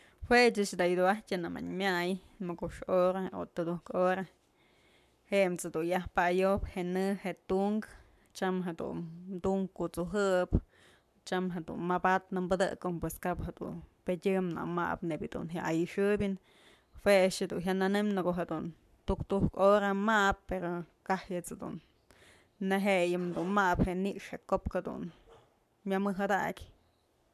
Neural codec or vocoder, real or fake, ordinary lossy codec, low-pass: codec, 44.1 kHz, 7.8 kbps, Pupu-Codec; fake; MP3, 96 kbps; 14.4 kHz